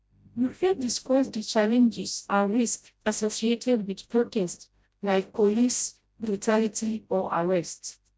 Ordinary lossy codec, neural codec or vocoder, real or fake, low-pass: none; codec, 16 kHz, 0.5 kbps, FreqCodec, smaller model; fake; none